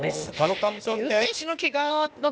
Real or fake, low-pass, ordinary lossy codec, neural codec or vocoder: fake; none; none; codec, 16 kHz, 0.8 kbps, ZipCodec